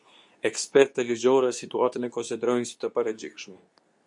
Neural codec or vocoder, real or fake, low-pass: codec, 24 kHz, 0.9 kbps, WavTokenizer, medium speech release version 2; fake; 10.8 kHz